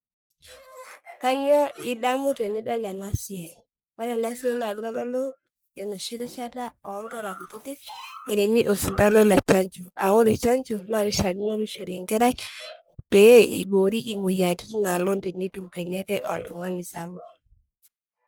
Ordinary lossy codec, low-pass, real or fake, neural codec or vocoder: none; none; fake; codec, 44.1 kHz, 1.7 kbps, Pupu-Codec